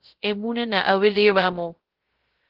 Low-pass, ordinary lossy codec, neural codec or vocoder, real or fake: 5.4 kHz; Opus, 16 kbps; codec, 16 kHz, 0.3 kbps, FocalCodec; fake